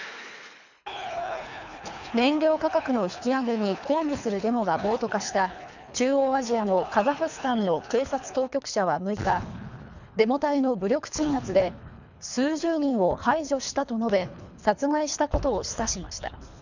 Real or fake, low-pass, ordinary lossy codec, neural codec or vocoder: fake; 7.2 kHz; none; codec, 24 kHz, 3 kbps, HILCodec